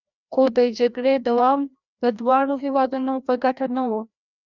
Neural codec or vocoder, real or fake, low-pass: codec, 16 kHz, 1 kbps, FreqCodec, larger model; fake; 7.2 kHz